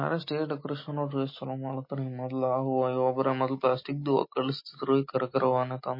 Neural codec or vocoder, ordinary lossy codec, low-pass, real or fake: none; MP3, 24 kbps; 5.4 kHz; real